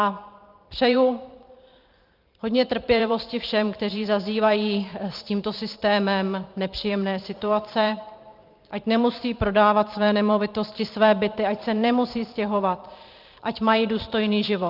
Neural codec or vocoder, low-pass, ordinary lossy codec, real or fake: vocoder, 44.1 kHz, 128 mel bands every 512 samples, BigVGAN v2; 5.4 kHz; Opus, 24 kbps; fake